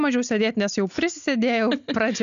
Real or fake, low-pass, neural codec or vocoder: real; 7.2 kHz; none